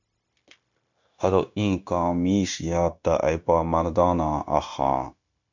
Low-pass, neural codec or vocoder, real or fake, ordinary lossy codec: 7.2 kHz; codec, 16 kHz, 0.9 kbps, LongCat-Audio-Codec; fake; MP3, 64 kbps